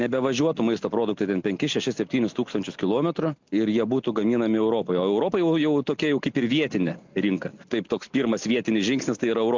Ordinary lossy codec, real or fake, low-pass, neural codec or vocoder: MP3, 64 kbps; real; 7.2 kHz; none